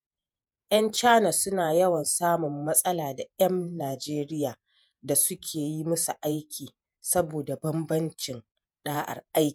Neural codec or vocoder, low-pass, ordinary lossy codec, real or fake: vocoder, 48 kHz, 128 mel bands, Vocos; none; none; fake